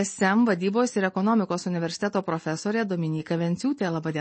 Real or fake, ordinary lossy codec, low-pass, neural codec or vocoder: real; MP3, 32 kbps; 9.9 kHz; none